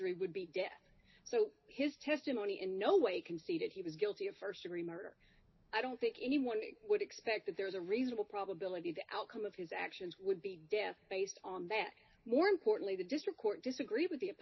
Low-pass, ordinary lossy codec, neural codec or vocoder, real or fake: 7.2 kHz; MP3, 24 kbps; none; real